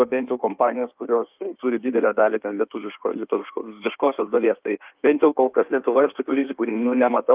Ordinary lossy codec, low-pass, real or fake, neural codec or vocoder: Opus, 32 kbps; 3.6 kHz; fake; codec, 16 kHz in and 24 kHz out, 1.1 kbps, FireRedTTS-2 codec